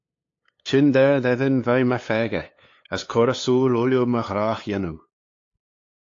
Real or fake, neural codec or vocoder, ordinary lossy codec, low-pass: fake; codec, 16 kHz, 2 kbps, FunCodec, trained on LibriTTS, 25 frames a second; AAC, 48 kbps; 7.2 kHz